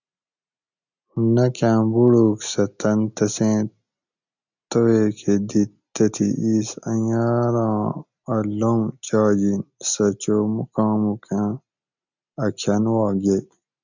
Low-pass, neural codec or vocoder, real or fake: 7.2 kHz; none; real